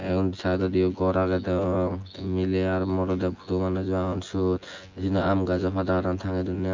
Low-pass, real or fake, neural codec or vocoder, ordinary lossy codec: 7.2 kHz; fake; vocoder, 24 kHz, 100 mel bands, Vocos; Opus, 32 kbps